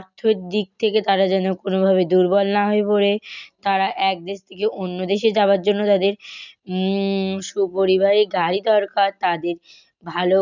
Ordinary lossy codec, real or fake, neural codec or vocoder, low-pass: none; real; none; 7.2 kHz